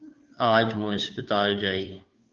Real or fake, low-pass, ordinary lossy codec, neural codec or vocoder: fake; 7.2 kHz; Opus, 24 kbps; codec, 16 kHz, 2 kbps, FunCodec, trained on Chinese and English, 25 frames a second